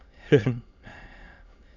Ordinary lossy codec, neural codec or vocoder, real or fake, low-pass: none; autoencoder, 22.05 kHz, a latent of 192 numbers a frame, VITS, trained on many speakers; fake; 7.2 kHz